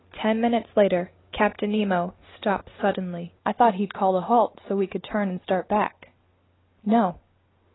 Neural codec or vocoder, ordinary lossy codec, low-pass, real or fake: none; AAC, 16 kbps; 7.2 kHz; real